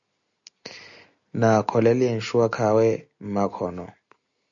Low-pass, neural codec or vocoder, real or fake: 7.2 kHz; none; real